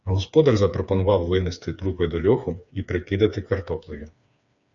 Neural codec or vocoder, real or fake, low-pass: codec, 16 kHz, 4 kbps, FreqCodec, smaller model; fake; 7.2 kHz